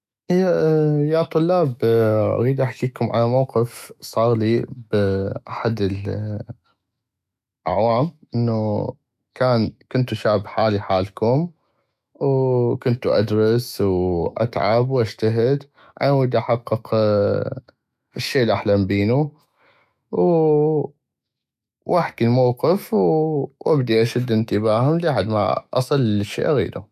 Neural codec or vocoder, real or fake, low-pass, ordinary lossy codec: autoencoder, 48 kHz, 128 numbers a frame, DAC-VAE, trained on Japanese speech; fake; 14.4 kHz; none